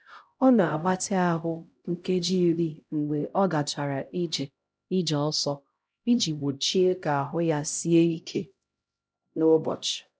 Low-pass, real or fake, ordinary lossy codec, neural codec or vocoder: none; fake; none; codec, 16 kHz, 0.5 kbps, X-Codec, HuBERT features, trained on LibriSpeech